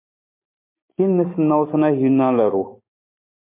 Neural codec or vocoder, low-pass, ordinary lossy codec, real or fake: none; 3.6 kHz; MP3, 24 kbps; real